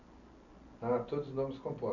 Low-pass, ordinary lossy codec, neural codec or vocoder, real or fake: 7.2 kHz; none; none; real